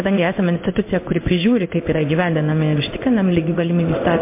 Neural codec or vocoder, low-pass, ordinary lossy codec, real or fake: codec, 16 kHz in and 24 kHz out, 1 kbps, XY-Tokenizer; 3.6 kHz; MP3, 24 kbps; fake